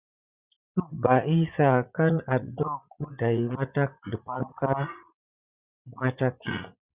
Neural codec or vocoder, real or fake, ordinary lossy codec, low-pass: vocoder, 44.1 kHz, 80 mel bands, Vocos; fake; Opus, 64 kbps; 3.6 kHz